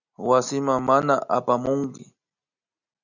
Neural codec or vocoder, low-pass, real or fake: none; 7.2 kHz; real